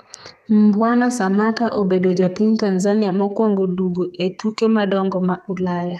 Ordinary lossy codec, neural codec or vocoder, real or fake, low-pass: none; codec, 32 kHz, 1.9 kbps, SNAC; fake; 14.4 kHz